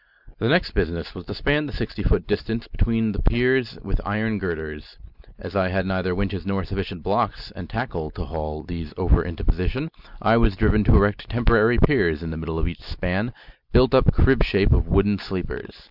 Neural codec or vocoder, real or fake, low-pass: none; real; 5.4 kHz